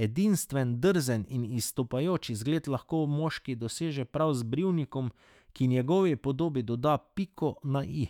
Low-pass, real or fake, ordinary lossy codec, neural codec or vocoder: 19.8 kHz; fake; none; autoencoder, 48 kHz, 128 numbers a frame, DAC-VAE, trained on Japanese speech